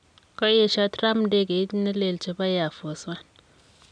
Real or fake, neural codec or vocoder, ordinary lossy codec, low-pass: real; none; none; 9.9 kHz